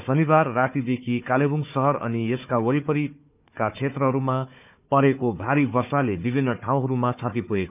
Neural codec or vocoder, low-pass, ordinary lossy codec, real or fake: codec, 16 kHz, 4 kbps, FunCodec, trained on Chinese and English, 50 frames a second; 3.6 kHz; none; fake